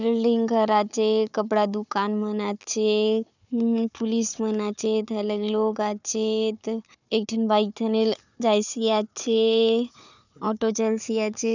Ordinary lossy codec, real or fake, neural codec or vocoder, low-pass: none; fake; codec, 16 kHz, 4 kbps, FunCodec, trained on Chinese and English, 50 frames a second; 7.2 kHz